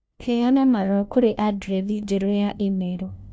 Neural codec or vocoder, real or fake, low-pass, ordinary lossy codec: codec, 16 kHz, 1 kbps, FunCodec, trained on LibriTTS, 50 frames a second; fake; none; none